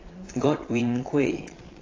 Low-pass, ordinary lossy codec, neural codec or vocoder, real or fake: 7.2 kHz; AAC, 32 kbps; vocoder, 22.05 kHz, 80 mel bands, Vocos; fake